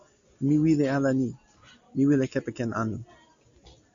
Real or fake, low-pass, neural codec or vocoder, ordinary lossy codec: real; 7.2 kHz; none; MP3, 64 kbps